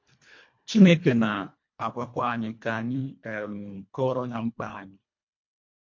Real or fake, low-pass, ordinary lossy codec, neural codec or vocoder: fake; 7.2 kHz; MP3, 48 kbps; codec, 24 kHz, 1.5 kbps, HILCodec